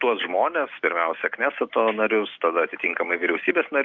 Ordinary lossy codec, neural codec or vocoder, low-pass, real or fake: Opus, 32 kbps; none; 7.2 kHz; real